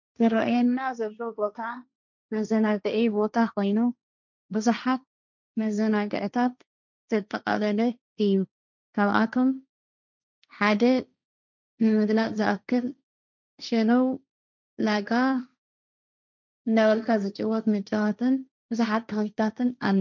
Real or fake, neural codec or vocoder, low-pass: fake; codec, 16 kHz, 1.1 kbps, Voila-Tokenizer; 7.2 kHz